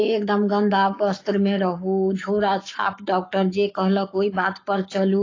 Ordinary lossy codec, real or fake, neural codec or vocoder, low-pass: AAC, 32 kbps; fake; codec, 44.1 kHz, 7.8 kbps, Pupu-Codec; 7.2 kHz